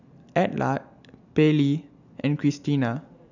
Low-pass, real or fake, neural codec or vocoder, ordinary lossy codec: 7.2 kHz; real; none; none